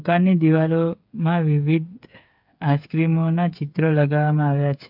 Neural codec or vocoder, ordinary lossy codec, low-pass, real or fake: codec, 16 kHz, 8 kbps, FreqCodec, smaller model; AAC, 48 kbps; 5.4 kHz; fake